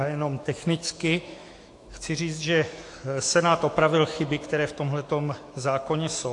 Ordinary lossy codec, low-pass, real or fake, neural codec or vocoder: AAC, 48 kbps; 10.8 kHz; fake; autoencoder, 48 kHz, 128 numbers a frame, DAC-VAE, trained on Japanese speech